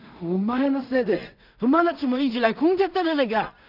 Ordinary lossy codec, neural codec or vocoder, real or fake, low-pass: none; codec, 16 kHz in and 24 kHz out, 0.4 kbps, LongCat-Audio-Codec, two codebook decoder; fake; 5.4 kHz